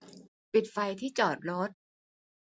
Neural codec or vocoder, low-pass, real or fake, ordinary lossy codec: none; none; real; none